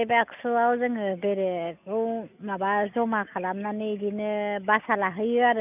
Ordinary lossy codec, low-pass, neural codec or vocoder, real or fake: none; 3.6 kHz; none; real